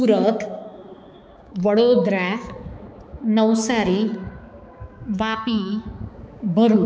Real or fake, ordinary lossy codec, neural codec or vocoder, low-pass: fake; none; codec, 16 kHz, 4 kbps, X-Codec, HuBERT features, trained on balanced general audio; none